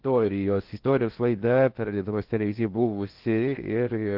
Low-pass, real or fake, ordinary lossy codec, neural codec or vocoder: 5.4 kHz; fake; Opus, 16 kbps; codec, 16 kHz in and 24 kHz out, 0.6 kbps, FocalCodec, streaming, 2048 codes